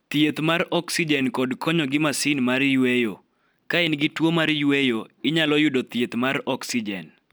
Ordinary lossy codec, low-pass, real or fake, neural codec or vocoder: none; none; real; none